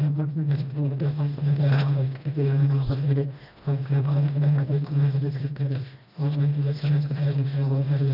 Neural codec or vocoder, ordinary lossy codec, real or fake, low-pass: codec, 16 kHz, 1 kbps, FreqCodec, smaller model; none; fake; 5.4 kHz